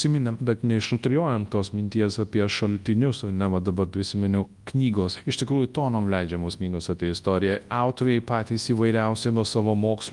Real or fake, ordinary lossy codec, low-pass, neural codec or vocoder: fake; Opus, 32 kbps; 10.8 kHz; codec, 24 kHz, 0.9 kbps, WavTokenizer, large speech release